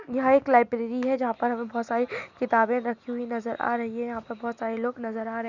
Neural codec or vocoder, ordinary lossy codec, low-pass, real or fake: none; none; 7.2 kHz; real